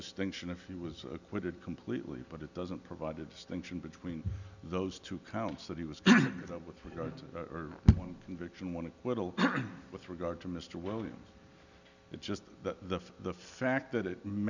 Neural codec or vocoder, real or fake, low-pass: none; real; 7.2 kHz